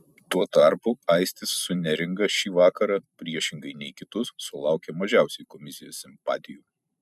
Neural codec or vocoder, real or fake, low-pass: vocoder, 44.1 kHz, 128 mel bands every 256 samples, BigVGAN v2; fake; 14.4 kHz